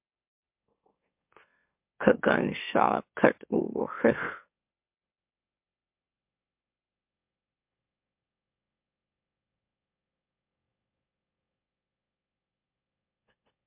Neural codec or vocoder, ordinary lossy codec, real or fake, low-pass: autoencoder, 44.1 kHz, a latent of 192 numbers a frame, MeloTTS; MP3, 32 kbps; fake; 3.6 kHz